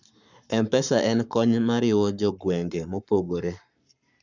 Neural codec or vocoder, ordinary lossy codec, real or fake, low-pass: codec, 44.1 kHz, 7.8 kbps, Pupu-Codec; none; fake; 7.2 kHz